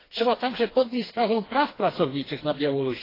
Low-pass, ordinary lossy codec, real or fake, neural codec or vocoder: 5.4 kHz; AAC, 24 kbps; fake; codec, 16 kHz, 2 kbps, FreqCodec, smaller model